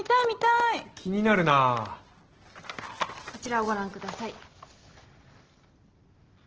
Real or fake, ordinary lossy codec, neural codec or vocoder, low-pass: real; Opus, 16 kbps; none; 7.2 kHz